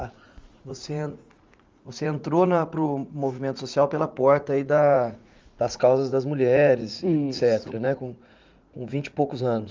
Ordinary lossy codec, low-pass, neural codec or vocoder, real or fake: Opus, 32 kbps; 7.2 kHz; vocoder, 44.1 kHz, 80 mel bands, Vocos; fake